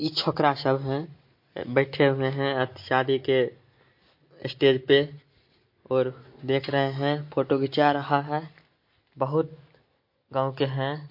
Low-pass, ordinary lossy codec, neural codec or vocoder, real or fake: 5.4 kHz; MP3, 32 kbps; none; real